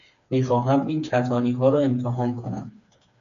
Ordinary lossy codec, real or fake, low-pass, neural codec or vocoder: MP3, 96 kbps; fake; 7.2 kHz; codec, 16 kHz, 4 kbps, FreqCodec, smaller model